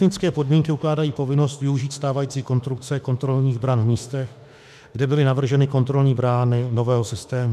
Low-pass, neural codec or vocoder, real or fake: 14.4 kHz; autoencoder, 48 kHz, 32 numbers a frame, DAC-VAE, trained on Japanese speech; fake